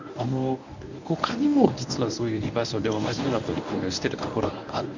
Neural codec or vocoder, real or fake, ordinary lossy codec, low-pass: codec, 24 kHz, 0.9 kbps, WavTokenizer, medium speech release version 1; fake; none; 7.2 kHz